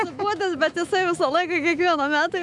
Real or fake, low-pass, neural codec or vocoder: fake; 10.8 kHz; autoencoder, 48 kHz, 128 numbers a frame, DAC-VAE, trained on Japanese speech